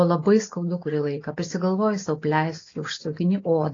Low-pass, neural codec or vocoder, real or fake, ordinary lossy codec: 7.2 kHz; none; real; AAC, 32 kbps